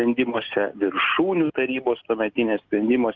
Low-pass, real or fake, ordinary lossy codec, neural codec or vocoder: 7.2 kHz; real; Opus, 32 kbps; none